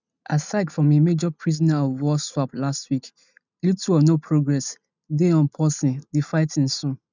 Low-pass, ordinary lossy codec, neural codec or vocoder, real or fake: 7.2 kHz; none; none; real